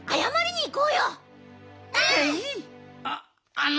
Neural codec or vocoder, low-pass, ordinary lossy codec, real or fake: none; none; none; real